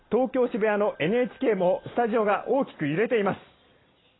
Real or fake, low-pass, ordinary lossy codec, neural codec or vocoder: real; 7.2 kHz; AAC, 16 kbps; none